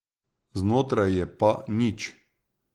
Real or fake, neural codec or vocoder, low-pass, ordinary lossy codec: real; none; 19.8 kHz; Opus, 16 kbps